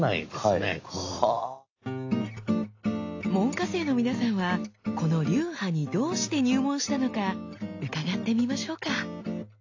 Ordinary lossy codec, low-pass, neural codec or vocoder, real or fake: AAC, 48 kbps; 7.2 kHz; none; real